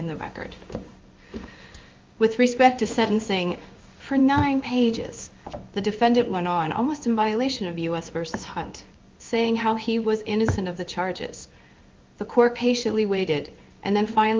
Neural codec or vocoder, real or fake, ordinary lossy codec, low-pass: codec, 16 kHz in and 24 kHz out, 1 kbps, XY-Tokenizer; fake; Opus, 32 kbps; 7.2 kHz